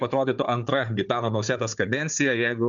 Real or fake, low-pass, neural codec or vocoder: fake; 7.2 kHz; codec, 16 kHz, 4 kbps, FunCodec, trained on Chinese and English, 50 frames a second